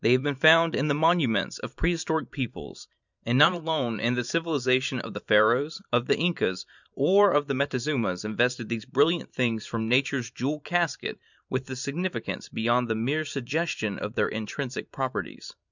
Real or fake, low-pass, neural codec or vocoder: fake; 7.2 kHz; vocoder, 44.1 kHz, 128 mel bands every 512 samples, BigVGAN v2